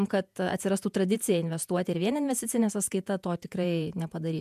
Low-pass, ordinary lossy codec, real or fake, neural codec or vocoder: 14.4 kHz; MP3, 96 kbps; fake; vocoder, 48 kHz, 128 mel bands, Vocos